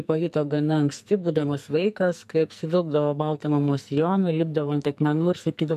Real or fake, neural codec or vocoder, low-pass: fake; codec, 44.1 kHz, 2.6 kbps, SNAC; 14.4 kHz